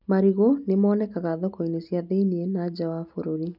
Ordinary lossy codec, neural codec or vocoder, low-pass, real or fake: none; none; 5.4 kHz; real